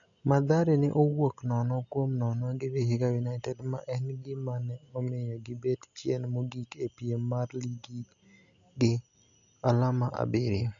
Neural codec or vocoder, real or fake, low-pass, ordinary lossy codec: none; real; 7.2 kHz; none